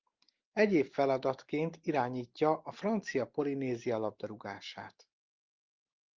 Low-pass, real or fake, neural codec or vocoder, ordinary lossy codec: 7.2 kHz; real; none; Opus, 32 kbps